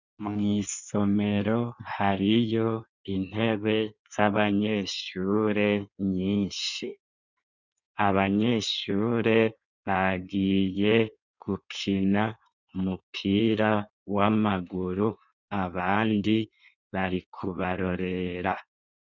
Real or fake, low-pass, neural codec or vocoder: fake; 7.2 kHz; codec, 16 kHz in and 24 kHz out, 1.1 kbps, FireRedTTS-2 codec